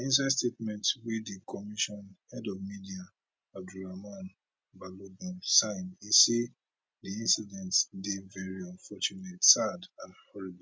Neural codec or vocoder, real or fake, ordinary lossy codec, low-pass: none; real; none; none